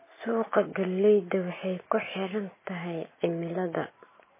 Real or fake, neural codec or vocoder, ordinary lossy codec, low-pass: real; none; MP3, 16 kbps; 3.6 kHz